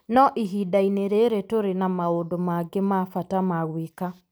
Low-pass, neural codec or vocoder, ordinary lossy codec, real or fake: none; none; none; real